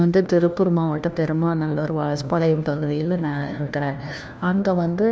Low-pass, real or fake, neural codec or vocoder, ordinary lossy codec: none; fake; codec, 16 kHz, 1 kbps, FunCodec, trained on LibriTTS, 50 frames a second; none